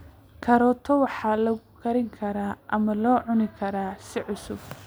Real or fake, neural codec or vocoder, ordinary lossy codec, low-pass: real; none; none; none